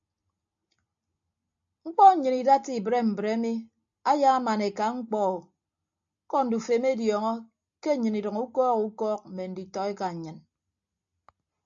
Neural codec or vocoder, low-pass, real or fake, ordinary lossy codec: none; 7.2 kHz; real; AAC, 64 kbps